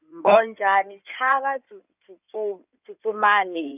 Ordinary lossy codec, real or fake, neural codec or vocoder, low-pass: none; fake; codec, 16 kHz in and 24 kHz out, 2.2 kbps, FireRedTTS-2 codec; 3.6 kHz